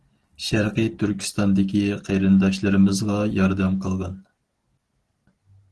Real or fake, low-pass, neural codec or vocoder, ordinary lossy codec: real; 10.8 kHz; none; Opus, 16 kbps